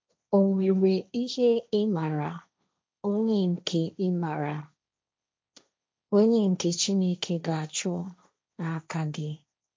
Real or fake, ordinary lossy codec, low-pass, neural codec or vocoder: fake; none; none; codec, 16 kHz, 1.1 kbps, Voila-Tokenizer